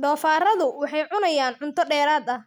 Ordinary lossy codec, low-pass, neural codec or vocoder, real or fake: none; none; none; real